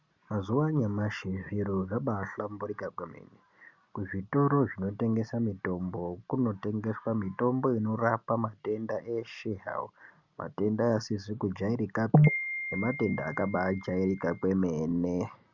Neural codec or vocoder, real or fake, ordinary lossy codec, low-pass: none; real; Opus, 64 kbps; 7.2 kHz